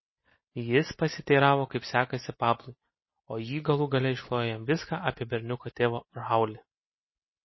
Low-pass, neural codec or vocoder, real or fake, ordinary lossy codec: 7.2 kHz; none; real; MP3, 24 kbps